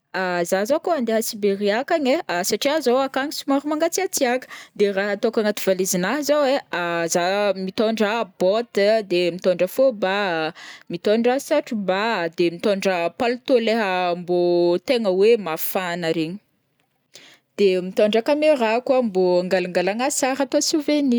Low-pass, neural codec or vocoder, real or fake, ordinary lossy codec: none; none; real; none